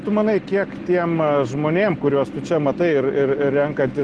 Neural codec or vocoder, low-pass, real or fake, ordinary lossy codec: none; 10.8 kHz; real; Opus, 16 kbps